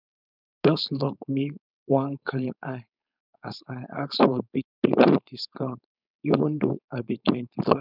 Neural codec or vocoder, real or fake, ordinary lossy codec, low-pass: codec, 16 kHz, 4.8 kbps, FACodec; fake; none; 5.4 kHz